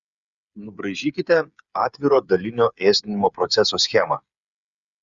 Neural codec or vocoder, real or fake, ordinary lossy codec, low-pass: codec, 16 kHz, 8 kbps, FreqCodec, smaller model; fake; Opus, 64 kbps; 7.2 kHz